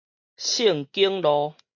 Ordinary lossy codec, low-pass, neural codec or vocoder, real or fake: MP3, 48 kbps; 7.2 kHz; none; real